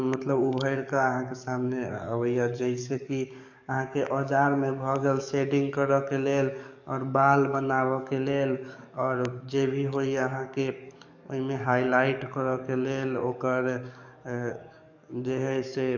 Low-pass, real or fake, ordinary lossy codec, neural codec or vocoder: 7.2 kHz; fake; none; codec, 44.1 kHz, 7.8 kbps, DAC